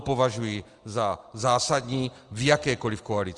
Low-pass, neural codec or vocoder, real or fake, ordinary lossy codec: 10.8 kHz; none; real; Opus, 24 kbps